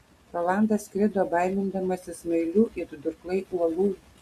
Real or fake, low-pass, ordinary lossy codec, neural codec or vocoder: real; 14.4 kHz; Opus, 64 kbps; none